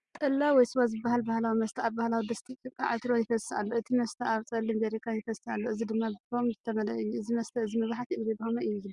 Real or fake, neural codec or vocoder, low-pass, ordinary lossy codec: real; none; 10.8 kHz; Opus, 64 kbps